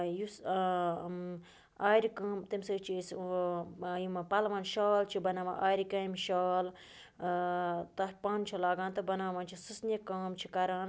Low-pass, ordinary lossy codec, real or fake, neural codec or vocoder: none; none; real; none